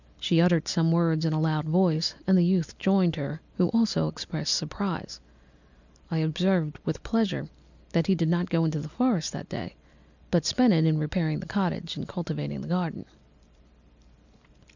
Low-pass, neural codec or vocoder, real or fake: 7.2 kHz; none; real